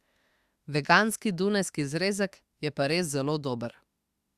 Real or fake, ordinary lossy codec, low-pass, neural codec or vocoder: fake; Opus, 64 kbps; 14.4 kHz; autoencoder, 48 kHz, 32 numbers a frame, DAC-VAE, trained on Japanese speech